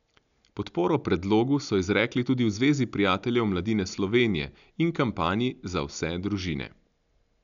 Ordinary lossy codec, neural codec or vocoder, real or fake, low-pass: none; none; real; 7.2 kHz